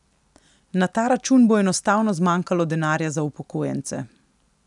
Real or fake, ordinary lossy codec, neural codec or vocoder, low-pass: real; none; none; 10.8 kHz